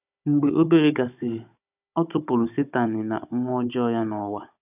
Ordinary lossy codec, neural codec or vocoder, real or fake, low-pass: none; codec, 16 kHz, 16 kbps, FunCodec, trained on Chinese and English, 50 frames a second; fake; 3.6 kHz